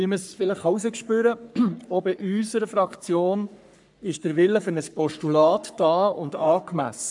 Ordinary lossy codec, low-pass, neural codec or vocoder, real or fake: MP3, 96 kbps; 10.8 kHz; codec, 44.1 kHz, 3.4 kbps, Pupu-Codec; fake